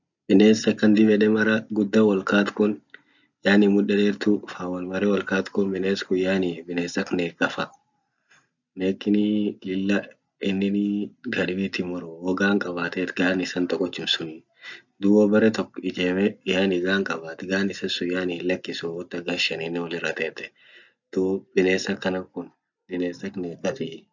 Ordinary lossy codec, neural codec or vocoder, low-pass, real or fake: none; none; 7.2 kHz; real